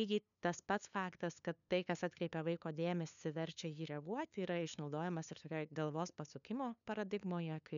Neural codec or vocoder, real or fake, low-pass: codec, 16 kHz, 2 kbps, FunCodec, trained on LibriTTS, 25 frames a second; fake; 7.2 kHz